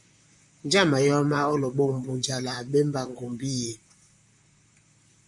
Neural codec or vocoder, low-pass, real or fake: vocoder, 44.1 kHz, 128 mel bands, Pupu-Vocoder; 10.8 kHz; fake